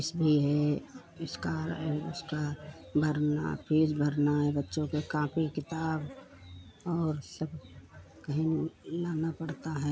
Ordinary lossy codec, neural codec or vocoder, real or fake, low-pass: none; none; real; none